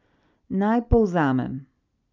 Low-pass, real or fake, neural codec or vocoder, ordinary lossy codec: 7.2 kHz; real; none; none